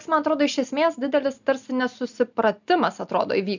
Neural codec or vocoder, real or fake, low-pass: none; real; 7.2 kHz